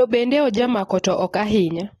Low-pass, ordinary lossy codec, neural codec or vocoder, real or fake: 19.8 kHz; AAC, 32 kbps; none; real